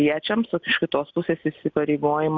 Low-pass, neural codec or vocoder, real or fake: 7.2 kHz; none; real